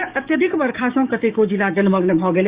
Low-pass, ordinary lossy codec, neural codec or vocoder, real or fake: 3.6 kHz; Opus, 64 kbps; codec, 16 kHz, 6 kbps, DAC; fake